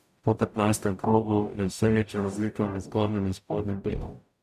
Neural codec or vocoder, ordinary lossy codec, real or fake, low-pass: codec, 44.1 kHz, 0.9 kbps, DAC; MP3, 96 kbps; fake; 14.4 kHz